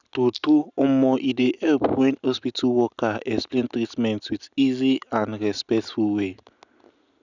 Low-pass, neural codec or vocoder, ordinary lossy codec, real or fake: 7.2 kHz; none; none; real